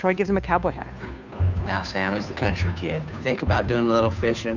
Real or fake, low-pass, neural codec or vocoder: fake; 7.2 kHz; codec, 16 kHz, 2 kbps, FunCodec, trained on Chinese and English, 25 frames a second